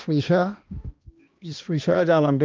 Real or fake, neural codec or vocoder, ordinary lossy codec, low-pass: fake; codec, 16 kHz, 1 kbps, X-Codec, HuBERT features, trained on balanced general audio; Opus, 32 kbps; 7.2 kHz